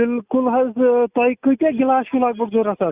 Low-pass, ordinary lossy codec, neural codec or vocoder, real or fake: 3.6 kHz; Opus, 64 kbps; none; real